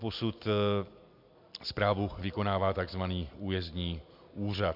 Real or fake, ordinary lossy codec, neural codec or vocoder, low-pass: real; MP3, 48 kbps; none; 5.4 kHz